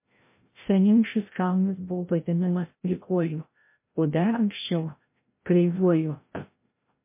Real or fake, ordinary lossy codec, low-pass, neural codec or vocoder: fake; MP3, 24 kbps; 3.6 kHz; codec, 16 kHz, 0.5 kbps, FreqCodec, larger model